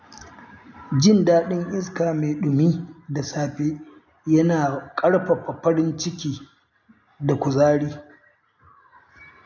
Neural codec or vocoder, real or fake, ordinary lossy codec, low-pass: none; real; none; 7.2 kHz